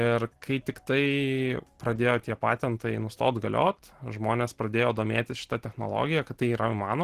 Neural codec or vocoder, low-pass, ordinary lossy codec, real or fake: none; 14.4 kHz; Opus, 16 kbps; real